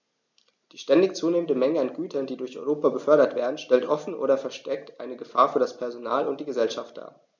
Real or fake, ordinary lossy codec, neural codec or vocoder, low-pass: real; none; none; 7.2 kHz